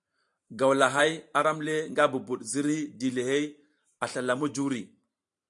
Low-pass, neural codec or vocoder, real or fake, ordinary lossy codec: 10.8 kHz; vocoder, 44.1 kHz, 128 mel bands every 512 samples, BigVGAN v2; fake; AAC, 64 kbps